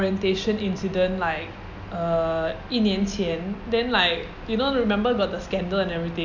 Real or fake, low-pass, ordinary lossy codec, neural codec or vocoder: real; 7.2 kHz; none; none